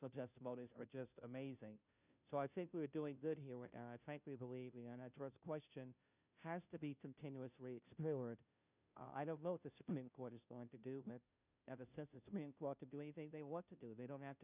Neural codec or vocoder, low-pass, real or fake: codec, 16 kHz, 0.5 kbps, FunCodec, trained on Chinese and English, 25 frames a second; 3.6 kHz; fake